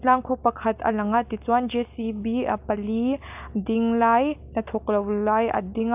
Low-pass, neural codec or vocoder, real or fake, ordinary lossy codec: 3.6 kHz; none; real; AAC, 32 kbps